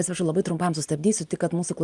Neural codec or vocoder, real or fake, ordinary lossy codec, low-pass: none; real; Opus, 32 kbps; 10.8 kHz